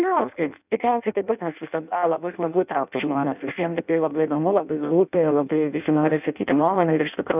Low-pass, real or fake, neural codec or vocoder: 3.6 kHz; fake; codec, 16 kHz in and 24 kHz out, 0.6 kbps, FireRedTTS-2 codec